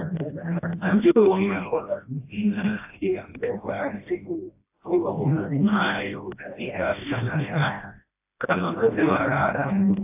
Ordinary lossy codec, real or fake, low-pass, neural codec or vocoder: AAC, 32 kbps; fake; 3.6 kHz; codec, 16 kHz, 1 kbps, FreqCodec, smaller model